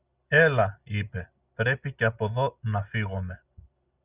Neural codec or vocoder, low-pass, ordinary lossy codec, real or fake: none; 3.6 kHz; Opus, 64 kbps; real